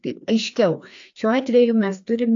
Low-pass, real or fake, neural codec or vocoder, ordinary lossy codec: 7.2 kHz; fake; codec, 16 kHz, 2 kbps, FreqCodec, larger model; AAC, 64 kbps